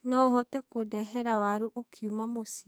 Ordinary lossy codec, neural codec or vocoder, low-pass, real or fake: none; codec, 44.1 kHz, 2.6 kbps, SNAC; none; fake